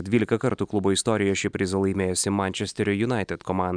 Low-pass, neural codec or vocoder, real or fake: 9.9 kHz; none; real